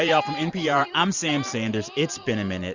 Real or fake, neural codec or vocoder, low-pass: fake; vocoder, 44.1 kHz, 128 mel bands every 512 samples, BigVGAN v2; 7.2 kHz